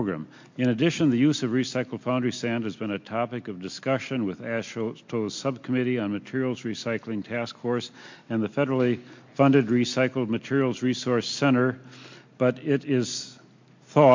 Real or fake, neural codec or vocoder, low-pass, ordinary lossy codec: real; none; 7.2 kHz; MP3, 48 kbps